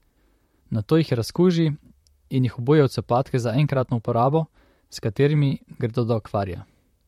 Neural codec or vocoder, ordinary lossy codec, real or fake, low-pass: vocoder, 44.1 kHz, 128 mel bands, Pupu-Vocoder; MP3, 64 kbps; fake; 19.8 kHz